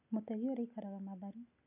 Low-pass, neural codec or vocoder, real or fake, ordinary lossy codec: 3.6 kHz; none; real; none